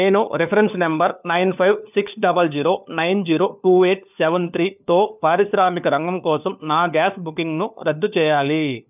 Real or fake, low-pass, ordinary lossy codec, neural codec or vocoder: fake; 3.6 kHz; none; codec, 16 kHz, 4 kbps, FunCodec, trained on LibriTTS, 50 frames a second